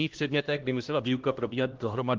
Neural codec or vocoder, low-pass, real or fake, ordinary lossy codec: codec, 16 kHz, 1 kbps, X-Codec, HuBERT features, trained on LibriSpeech; 7.2 kHz; fake; Opus, 16 kbps